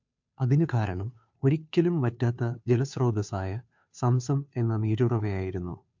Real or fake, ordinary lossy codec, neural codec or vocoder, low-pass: fake; MP3, 64 kbps; codec, 16 kHz, 2 kbps, FunCodec, trained on Chinese and English, 25 frames a second; 7.2 kHz